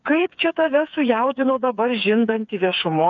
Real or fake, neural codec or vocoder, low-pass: fake; codec, 16 kHz, 4 kbps, FreqCodec, smaller model; 7.2 kHz